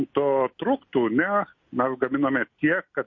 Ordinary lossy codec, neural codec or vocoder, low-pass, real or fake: MP3, 32 kbps; none; 7.2 kHz; real